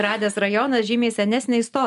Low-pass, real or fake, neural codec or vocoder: 10.8 kHz; real; none